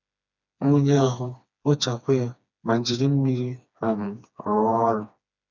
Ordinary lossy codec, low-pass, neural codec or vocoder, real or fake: none; 7.2 kHz; codec, 16 kHz, 2 kbps, FreqCodec, smaller model; fake